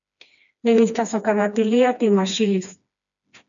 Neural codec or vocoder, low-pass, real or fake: codec, 16 kHz, 2 kbps, FreqCodec, smaller model; 7.2 kHz; fake